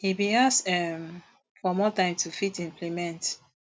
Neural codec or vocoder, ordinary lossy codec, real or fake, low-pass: none; none; real; none